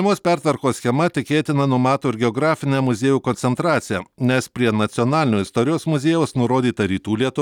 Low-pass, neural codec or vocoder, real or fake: 19.8 kHz; none; real